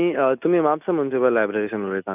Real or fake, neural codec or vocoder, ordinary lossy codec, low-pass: real; none; none; 3.6 kHz